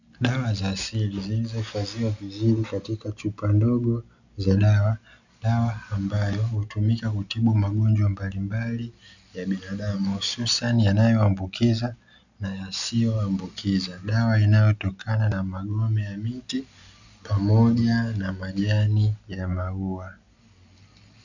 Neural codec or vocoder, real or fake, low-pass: none; real; 7.2 kHz